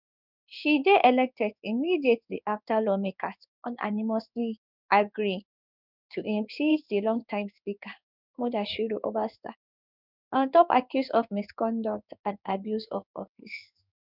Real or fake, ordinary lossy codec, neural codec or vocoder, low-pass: fake; none; codec, 16 kHz in and 24 kHz out, 1 kbps, XY-Tokenizer; 5.4 kHz